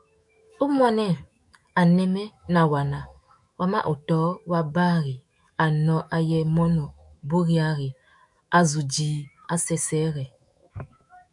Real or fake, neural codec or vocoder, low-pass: fake; autoencoder, 48 kHz, 128 numbers a frame, DAC-VAE, trained on Japanese speech; 10.8 kHz